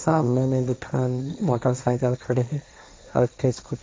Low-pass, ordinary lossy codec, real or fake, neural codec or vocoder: none; none; fake; codec, 16 kHz, 1.1 kbps, Voila-Tokenizer